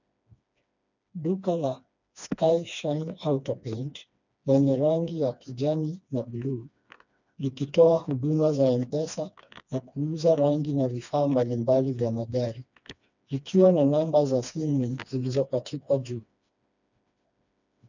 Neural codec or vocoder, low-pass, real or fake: codec, 16 kHz, 2 kbps, FreqCodec, smaller model; 7.2 kHz; fake